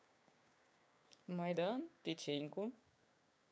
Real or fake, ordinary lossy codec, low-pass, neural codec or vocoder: fake; none; none; codec, 16 kHz, 6 kbps, DAC